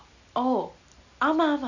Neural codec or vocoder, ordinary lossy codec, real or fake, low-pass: none; none; real; 7.2 kHz